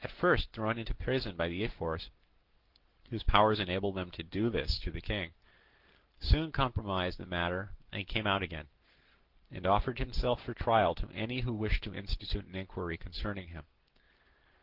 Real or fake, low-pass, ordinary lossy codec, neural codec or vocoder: real; 5.4 kHz; Opus, 16 kbps; none